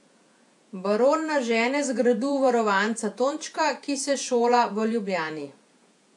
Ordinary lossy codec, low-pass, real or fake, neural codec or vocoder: AAC, 64 kbps; 10.8 kHz; fake; vocoder, 48 kHz, 128 mel bands, Vocos